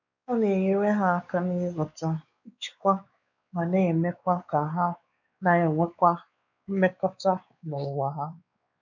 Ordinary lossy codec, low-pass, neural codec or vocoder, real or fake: none; 7.2 kHz; codec, 16 kHz, 4 kbps, X-Codec, WavLM features, trained on Multilingual LibriSpeech; fake